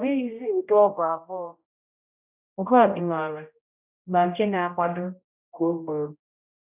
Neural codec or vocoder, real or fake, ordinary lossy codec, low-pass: codec, 16 kHz, 0.5 kbps, X-Codec, HuBERT features, trained on general audio; fake; none; 3.6 kHz